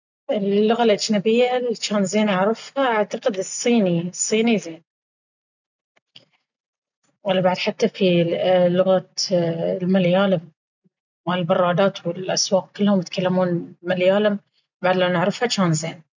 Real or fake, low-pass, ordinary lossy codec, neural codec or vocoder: real; 7.2 kHz; none; none